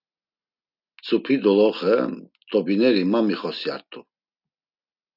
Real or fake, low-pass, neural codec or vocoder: real; 5.4 kHz; none